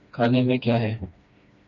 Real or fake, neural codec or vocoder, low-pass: fake; codec, 16 kHz, 2 kbps, FreqCodec, smaller model; 7.2 kHz